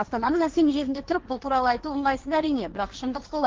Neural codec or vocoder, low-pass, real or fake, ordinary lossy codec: codec, 16 kHz, 1.1 kbps, Voila-Tokenizer; 7.2 kHz; fake; Opus, 16 kbps